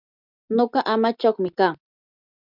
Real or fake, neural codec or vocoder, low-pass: real; none; 5.4 kHz